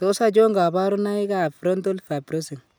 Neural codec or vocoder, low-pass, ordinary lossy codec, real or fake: none; none; none; real